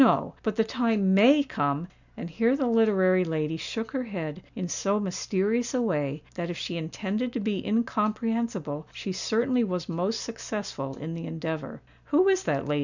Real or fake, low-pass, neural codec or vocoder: real; 7.2 kHz; none